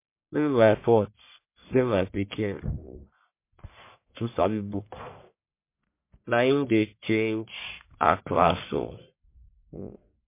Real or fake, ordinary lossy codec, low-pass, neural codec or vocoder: fake; MP3, 24 kbps; 3.6 kHz; codec, 44.1 kHz, 1.7 kbps, Pupu-Codec